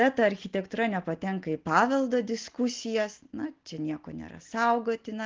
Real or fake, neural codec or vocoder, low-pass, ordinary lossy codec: real; none; 7.2 kHz; Opus, 16 kbps